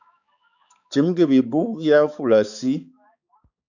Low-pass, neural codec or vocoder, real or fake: 7.2 kHz; codec, 16 kHz, 4 kbps, X-Codec, HuBERT features, trained on balanced general audio; fake